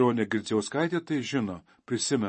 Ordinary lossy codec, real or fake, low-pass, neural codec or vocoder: MP3, 32 kbps; fake; 10.8 kHz; vocoder, 44.1 kHz, 128 mel bands every 512 samples, BigVGAN v2